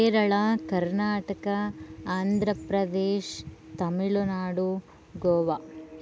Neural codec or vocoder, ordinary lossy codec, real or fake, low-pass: none; none; real; none